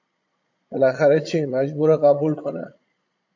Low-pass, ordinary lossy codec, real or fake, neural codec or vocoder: 7.2 kHz; AAC, 48 kbps; fake; vocoder, 22.05 kHz, 80 mel bands, Vocos